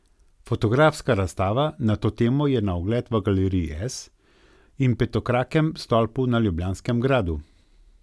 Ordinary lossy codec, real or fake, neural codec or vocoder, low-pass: none; real; none; none